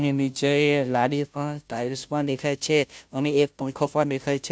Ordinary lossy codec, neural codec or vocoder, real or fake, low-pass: none; codec, 16 kHz, 0.5 kbps, FunCodec, trained on Chinese and English, 25 frames a second; fake; none